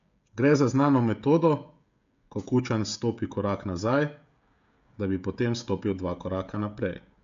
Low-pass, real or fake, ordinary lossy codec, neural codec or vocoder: 7.2 kHz; fake; MP3, 64 kbps; codec, 16 kHz, 16 kbps, FreqCodec, smaller model